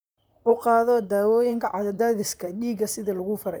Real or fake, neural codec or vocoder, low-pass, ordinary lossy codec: fake; vocoder, 44.1 kHz, 128 mel bands, Pupu-Vocoder; none; none